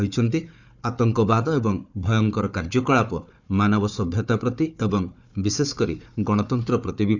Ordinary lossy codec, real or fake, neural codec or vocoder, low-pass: Opus, 64 kbps; fake; codec, 16 kHz, 4 kbps, FunCodec, trained on Chinese and English, 50 frames a second; 7.2 kHz